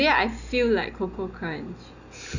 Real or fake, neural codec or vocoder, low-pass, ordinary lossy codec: real; none; 7.2 kHz; none